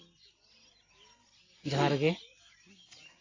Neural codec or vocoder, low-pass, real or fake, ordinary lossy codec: none; 7.2 kHz; real; AAC, 32 kbps